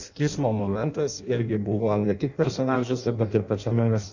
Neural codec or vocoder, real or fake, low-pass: codec, 16 kHz in and 24 kHz out, 0.6 kbps, FireRedTTS-2 codec; fake; 7.2 kHz